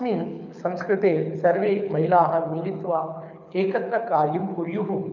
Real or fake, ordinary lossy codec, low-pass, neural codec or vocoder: fake; none; 7.2 kHz; codec, 24 kHz, 6 kbps, HILCodec